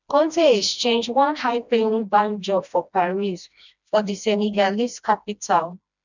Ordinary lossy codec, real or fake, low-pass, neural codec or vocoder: none; fake; 7.2 kHz; codec, 16 kHz, 1 kbps, FreqCodec, smaller model